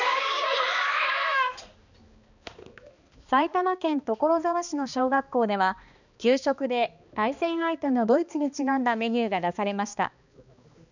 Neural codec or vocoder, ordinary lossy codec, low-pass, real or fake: codec, 16 kHz, 2 kbps, X-Codec, HuBERT features, trained on balanced general audio; none; 7.2 kHz; fake